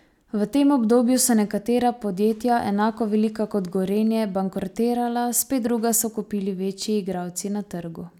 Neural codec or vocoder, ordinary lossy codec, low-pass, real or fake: none; none; 19.8 kHz; real